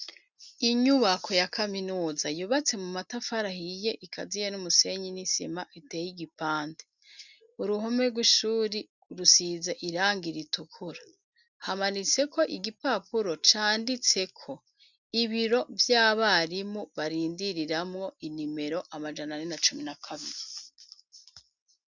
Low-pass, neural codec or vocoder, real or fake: 7.2 kHz; none; real